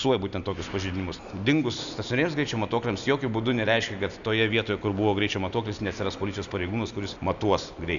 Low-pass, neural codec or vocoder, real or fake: 7.2 kHz; none; real